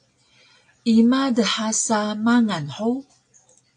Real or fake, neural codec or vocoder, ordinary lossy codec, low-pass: real; none; AAC, 64 kbps; 9.9 kHz